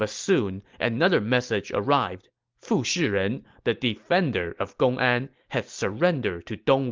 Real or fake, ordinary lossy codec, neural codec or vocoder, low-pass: real; Opus, 32 kbps; none; 7.2 kHz